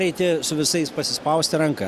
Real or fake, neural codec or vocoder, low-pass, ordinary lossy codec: real; none; 14.4 kHz; Opus, 64 kbps